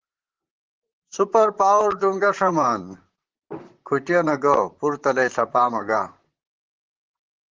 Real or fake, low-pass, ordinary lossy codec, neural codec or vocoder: fake; 7.2 kHz; Opus, 16 kbps; vocoder, 44.1 kHz, 128 mel bands, Pupu-Vocoder